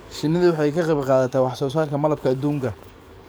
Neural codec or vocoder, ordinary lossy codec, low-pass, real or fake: codec, 44.1 kHz, 7.8 kbps, DAC; none; none; fake